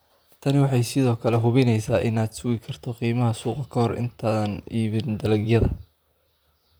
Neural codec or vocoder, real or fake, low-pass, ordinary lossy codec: vocoder, 44.1 kHz, 128 mel bands, Pupu-Vocoder; fake; none; none